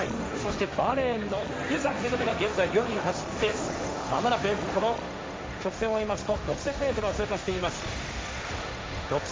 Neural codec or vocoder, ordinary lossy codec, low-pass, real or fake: codec, 16 kHz, 1.1 kbps, Voila-Tokenizer; none; none; fake